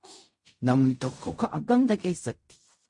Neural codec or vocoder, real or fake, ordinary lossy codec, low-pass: codec, 16 kHz in and 24 kHz out, 0.4 kbps, LongCat-Audio-Codec, fine tuned four codebook decoder; fake; MP3, 48 kbps; 10.8 kHz